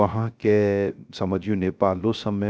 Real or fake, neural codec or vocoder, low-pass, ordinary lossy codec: fake; codec, 16 kHz, 0.3 kbps, FocalCodec; none; none